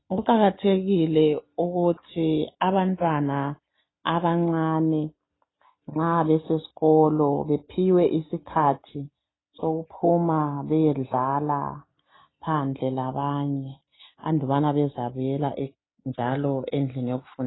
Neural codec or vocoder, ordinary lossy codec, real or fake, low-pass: none; AAC, 16 kbps; real; 7.2 kHz